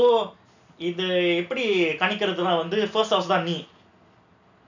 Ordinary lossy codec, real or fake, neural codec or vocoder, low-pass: none; real; none; 7.2 kHz